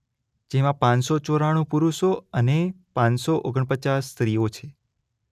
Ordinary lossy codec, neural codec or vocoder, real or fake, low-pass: none; none; real; 14.4 kHz